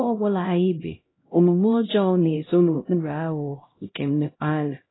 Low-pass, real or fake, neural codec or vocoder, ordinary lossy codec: 7.2 kHz; fake; codec, 16 kHz, 0.5 kbps, X-Codec, HuBERT features, trained on LibriSpeech; AAC, 16 kbps